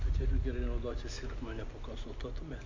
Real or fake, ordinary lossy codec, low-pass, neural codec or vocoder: real; MP3, 64 kbps; 7.2 kHz; none